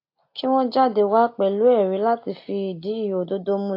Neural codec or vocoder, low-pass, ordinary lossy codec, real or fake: none; 5.4 kHz; AAC, 32 kbps; real